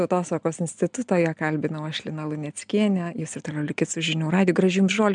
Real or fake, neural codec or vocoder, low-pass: real; none; 9.9 kHz